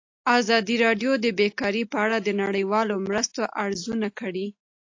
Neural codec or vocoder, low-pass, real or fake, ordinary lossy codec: none; 7.2 kHz; real; AAC, 48 kbps